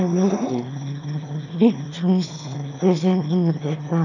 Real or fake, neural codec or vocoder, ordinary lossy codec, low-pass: fake; autoencoder, 22.05 kHz, a latent of 192 numbers a frame, VITS, trained on one speaker; none; 7.2 kHz